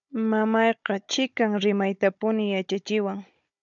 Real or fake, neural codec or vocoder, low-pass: fake; codec, 16 kHz, 16 kbps, FunCodec, trained on Chinese and English, 50 frames a second; 7.2 kHz